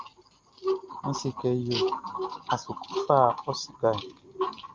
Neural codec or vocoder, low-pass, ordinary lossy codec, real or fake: none; 7.2 kHz; Opus, 32 kbps; real